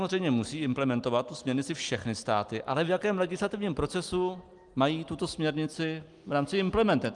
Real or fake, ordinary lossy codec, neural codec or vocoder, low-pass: real; Opus, 32 kbps; none; 10.8 kHz